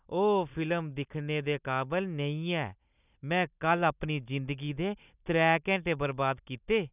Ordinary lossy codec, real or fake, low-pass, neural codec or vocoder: none; real; 3.6 kHz; none